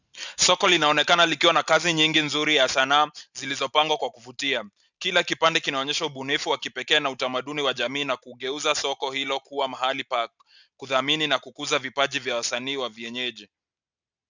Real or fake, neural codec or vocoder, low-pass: real; none; 7.2 kHz